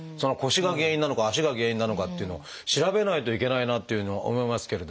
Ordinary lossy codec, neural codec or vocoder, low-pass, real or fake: none; none; none; real